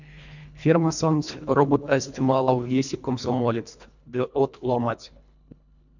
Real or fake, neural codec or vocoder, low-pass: fake; codec, 24 kHz, 1.5 kbps, HILCodec; 7.2 kHz